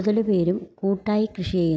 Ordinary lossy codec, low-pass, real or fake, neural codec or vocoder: none; none; real; none